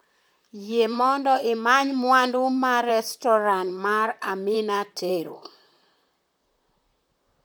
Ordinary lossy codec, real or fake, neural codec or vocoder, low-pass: none; fake; vocoder, 44.1 kHz, 128 mel bands, Pupu-Vocoder; none